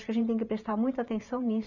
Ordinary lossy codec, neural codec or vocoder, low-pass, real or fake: none; vocoder, 44.1 kHz, 128 mel bands every 512 samples, BigVGAN v2; 7.2 kHz; fake